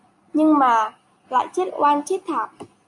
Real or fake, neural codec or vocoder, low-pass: real; none; 10.8 kHz